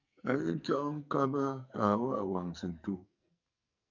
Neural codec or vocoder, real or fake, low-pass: codec, 44.1 kHz, 2.6 kbps, SNAC; fake; 7.2 kHz